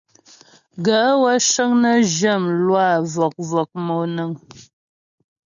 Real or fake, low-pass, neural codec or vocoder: real; 7.2 kHz; none